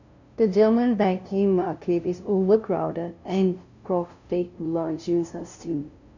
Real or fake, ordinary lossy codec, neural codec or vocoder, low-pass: fake; none; codec, 16 kHz, 0.5 kbps, FunCodec, trained on LibriTTS, 25 frames a second; 7.2 kHz